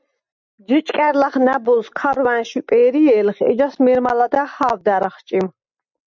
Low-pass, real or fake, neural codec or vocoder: 7.2 kHz; real; none